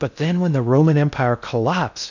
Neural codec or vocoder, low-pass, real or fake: codec, 16 kHz in and 24 kHz out, 0.6 kbps, FocalCodec, streaming, 2048 codes; 7.2 kHz; fake